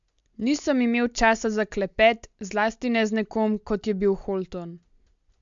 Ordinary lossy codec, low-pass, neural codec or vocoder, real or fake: MP3, 64 kbps; 7.2 kHz; none; real